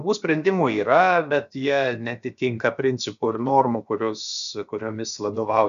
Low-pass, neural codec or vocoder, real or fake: 7.2 kHz; codec, 16 kHz, about 1 kbps, DyCAST, with the encoder's durations; fake